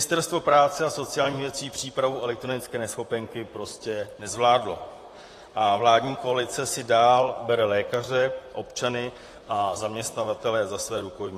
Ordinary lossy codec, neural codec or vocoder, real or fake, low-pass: AAC, 48 kbps; vocoder, 44.1 kHz, 128 mel bands, Pupu-Vocoder; fake; 14.4 kHz